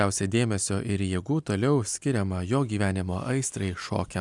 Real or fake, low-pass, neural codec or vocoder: real; 10.8 kHz; none